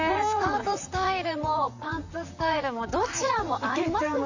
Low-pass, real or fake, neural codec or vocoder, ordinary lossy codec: 7.2 kHz; fake; vocoder, 44.1 kHz, 128 mel bands, Pupu-Vocoder; none